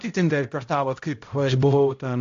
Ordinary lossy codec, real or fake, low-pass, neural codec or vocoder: AAC, 48 kbps; fake; 7.2 kHz; codec, 16 kHz, 0.5 kbps, X-Codec, HuBERT features, trained on balanced general audio